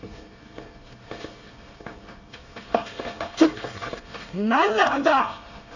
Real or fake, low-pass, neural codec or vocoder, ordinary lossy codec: fake; 7.2 kHz; codec, 24 kHz, 1 kbps, SNAC; none